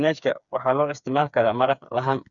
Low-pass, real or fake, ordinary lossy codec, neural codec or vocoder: 7.2 kHz; fake; none; codec, 16 kHz, 4 kbps, FreqCodec, smaller model